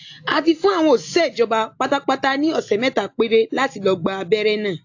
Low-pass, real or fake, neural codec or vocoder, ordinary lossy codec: 7.2 kHz; real; none; AAC, 48 kbps